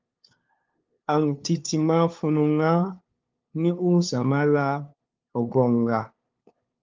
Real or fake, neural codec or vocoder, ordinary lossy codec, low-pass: fake; codec, 16 kHz, 2 kbps, FunCodec, trained on LibriTTS, 25 frames a second; Opus, 32 kbps; 7.2 kHz